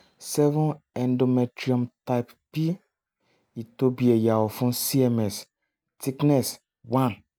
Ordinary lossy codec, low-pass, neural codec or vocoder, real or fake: none; none; none; real